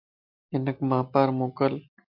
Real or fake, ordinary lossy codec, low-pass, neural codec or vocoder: real; MP3, 48 kbps; 5.4 kHz; none